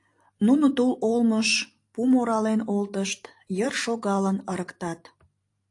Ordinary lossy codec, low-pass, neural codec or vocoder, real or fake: AAC, 48 kbps; 10.8 kHz; vocoder, 44.1 kHz, 128 mel bands every 512 samples, BigVGAN v2; fake